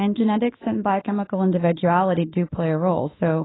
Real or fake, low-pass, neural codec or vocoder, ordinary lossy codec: fake; 7.2 kHz; autoencoder, 48 kHz, 32 numbers a frame, DAC-VAE, trained on Japanese speech; AAC, 16 kbps